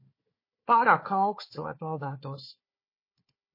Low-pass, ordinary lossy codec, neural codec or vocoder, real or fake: 5.4 kHz; MP3, 24 kbps; codec, 16 kHz, 4 kbps, FunCodec, trained on Chinese and English, 50 frames a second; fake